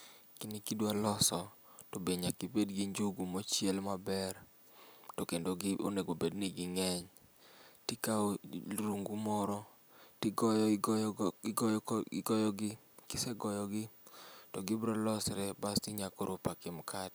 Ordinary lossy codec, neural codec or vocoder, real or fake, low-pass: none; none; real; none